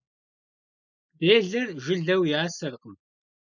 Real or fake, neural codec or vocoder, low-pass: real; none; 7.2 kHz